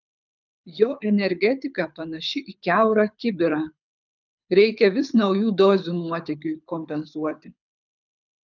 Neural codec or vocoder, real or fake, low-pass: codec, 24 kHz, 6 kbps, HILCodec; fake; 7.2 kHz